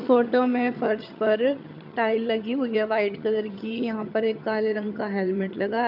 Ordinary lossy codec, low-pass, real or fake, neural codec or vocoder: none; 5.4 kHz; fake; vocoder, 22.05 kHz, 80 mel bands, HiFi-GAN